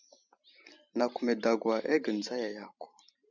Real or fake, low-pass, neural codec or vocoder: fake; 7.2 kHz; vocoder, 44.1 kHz, 128 mel bands every 512 samples, BigVGAN v2